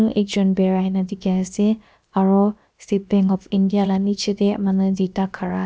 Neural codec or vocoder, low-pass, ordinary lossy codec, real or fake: codec, 16 kHz, about 1 kbps, DyCAST, with the encoder's durations; none; none; fake